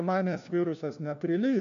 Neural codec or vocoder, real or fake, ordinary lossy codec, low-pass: codec, 16 kHz, 1 kbps, FunCodec, trained on LibriTTS, 50 frames a second; fake; MP3, 64 kbps; 7.2 kHz